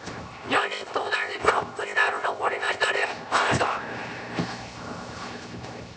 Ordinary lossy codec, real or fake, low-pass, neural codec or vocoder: none; fake; none; codec, 16 kHz, 0.7 kbps, FocalCodec